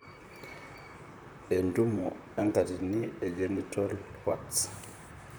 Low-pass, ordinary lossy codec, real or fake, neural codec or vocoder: none; none; fake; vocoder, 44.1 kHz, 128 mel bands, Pupu-Vocoder